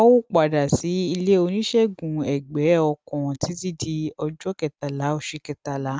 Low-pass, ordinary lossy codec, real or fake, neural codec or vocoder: none; none; real; none